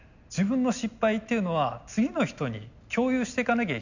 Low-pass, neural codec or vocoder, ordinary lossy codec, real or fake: 7.2 kHz; none; none; real